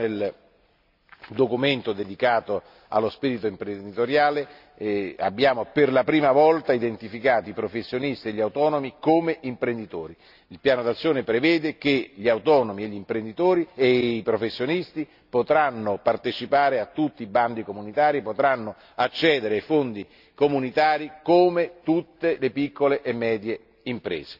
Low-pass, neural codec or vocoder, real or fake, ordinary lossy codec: 5.4 kHz; none; real; none